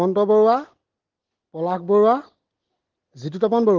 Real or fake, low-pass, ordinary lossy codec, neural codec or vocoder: real; 7.2 kHz; Opus, 16 kbps; none